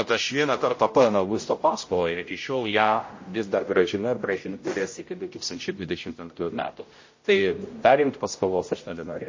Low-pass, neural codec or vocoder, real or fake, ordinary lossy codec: 7.2 kHz; codec, 16 kHz, 0.5 kbps, X-Codec, HuBERT features, trained on general audio; fake; MP3, 32 kbps